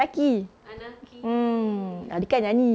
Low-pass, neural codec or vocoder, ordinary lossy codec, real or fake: none; none; none; real